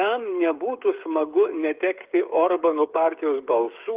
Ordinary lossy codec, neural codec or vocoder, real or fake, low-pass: Opus, 32 kbps; codec, 16 kHz, 8 kbps, FreqCodec, smaller model; fake; 3.6 kHz